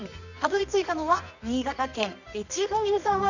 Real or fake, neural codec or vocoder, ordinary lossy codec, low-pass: fake; codec, 24 kHz, 0.9 kbps, WavTokenizer, medium music audio release; none; 7.2 kHz